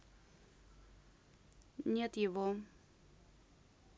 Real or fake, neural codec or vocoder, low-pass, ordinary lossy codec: real; none; none; none